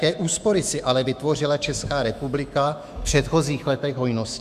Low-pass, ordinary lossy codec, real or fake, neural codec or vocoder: 14.4 kHz; Opus, 64 kbps; fake; autoencoder, 48 kHz, 128 numbers a frame, DAC-VAE, trained on Japanese speech